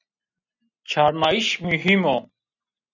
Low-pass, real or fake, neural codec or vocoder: 7.2 kHz; real; none